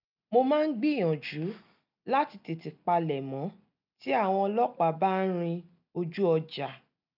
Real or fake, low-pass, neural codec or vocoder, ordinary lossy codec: real; 5.4 kHz; none; none